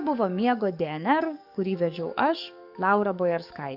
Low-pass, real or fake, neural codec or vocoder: 5.4 kHz; fake; codec, 44.1 kHz, 7.8 kbps, DAC